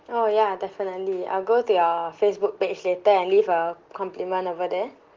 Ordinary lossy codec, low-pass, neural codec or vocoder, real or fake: Opus, 16 kbps; 7.2 kHz; none; real